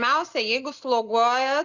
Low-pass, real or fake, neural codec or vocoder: 7.2 kHz; real; none